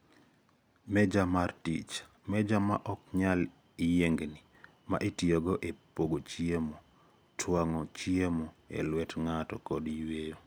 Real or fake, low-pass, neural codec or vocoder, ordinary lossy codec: real; none; none; none